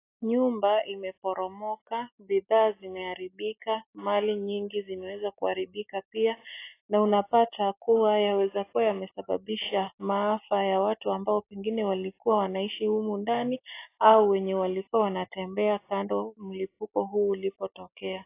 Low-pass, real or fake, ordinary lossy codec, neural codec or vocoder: 3.6 kHz; real; AAC, 24 kbps; none